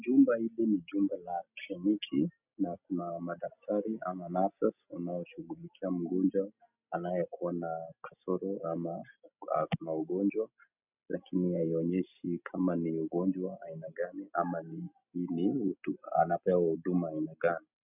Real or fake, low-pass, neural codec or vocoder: real; 3.6 kHz; none